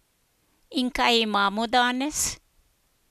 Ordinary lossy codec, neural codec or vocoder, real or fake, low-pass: none; none; real; 14.4 kHz